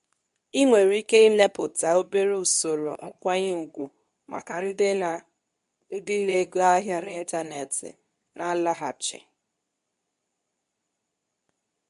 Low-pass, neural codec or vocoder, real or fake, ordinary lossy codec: 10.8 kHz; codec, 24 kHz, 0.9 kbps, WavTokenizer, medium speech release version 2; fake; AAC, 96 kbps